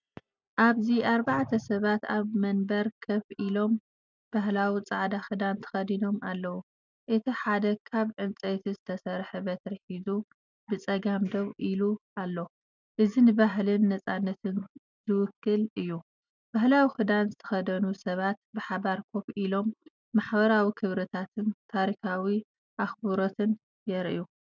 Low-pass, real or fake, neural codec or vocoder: 7.2 kHz; real; none